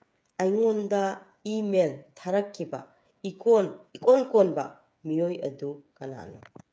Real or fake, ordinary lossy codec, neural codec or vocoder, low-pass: fake; none; codec, 16 kHz, 8 kbps, FreqCodec, smaller model; none